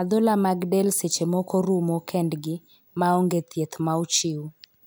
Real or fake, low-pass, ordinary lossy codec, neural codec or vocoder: real; none; none; none